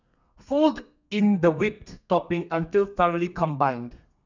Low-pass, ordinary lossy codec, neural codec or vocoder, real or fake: 7.2 kHz; none; codec, 32 kHz, 1.9 kbps, SNAC; fake